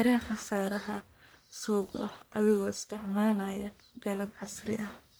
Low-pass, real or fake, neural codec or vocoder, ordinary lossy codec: none; fake; codec, 44.1 kHz, 1.7 kbps, Pupu-Codec; none